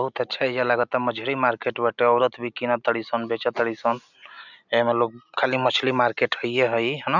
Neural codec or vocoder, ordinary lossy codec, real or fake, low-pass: none; none; real; 7.2 kHz